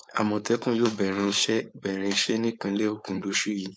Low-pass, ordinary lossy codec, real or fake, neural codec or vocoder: none; none; fake; codec, 16 kHz, 4.8 kbps, FACodec